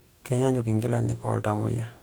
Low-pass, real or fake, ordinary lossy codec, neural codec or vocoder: none; fake; none; codec, 44.1 kHz, 2.6 kbps, DAC